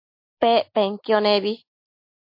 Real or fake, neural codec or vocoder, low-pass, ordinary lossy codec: real; none; 5.4 kHz; MP3, 32 kbps